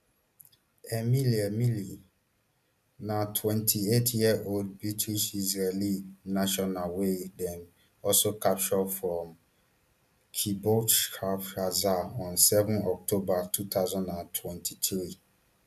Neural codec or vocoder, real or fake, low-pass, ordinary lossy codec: none; real; 14.4 kHz; none